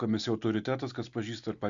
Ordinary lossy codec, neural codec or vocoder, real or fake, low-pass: Opus, 64 kbps; none; real; 7.2 kHz